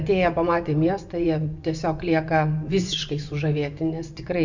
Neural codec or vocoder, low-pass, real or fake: vocoder, 24 kHz, 100 mel bands, Vocos; 7.2 kHz; fake